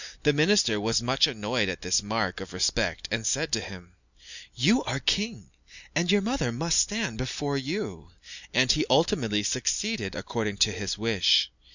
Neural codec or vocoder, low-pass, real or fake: none; 7.2 kHz; real